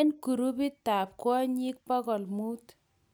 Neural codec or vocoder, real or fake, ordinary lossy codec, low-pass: none; real; none; none